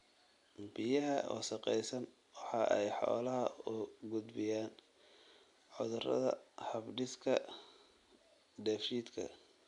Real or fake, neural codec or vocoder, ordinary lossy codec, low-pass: real; none; AAC, 64 kbps; 10.8 kHz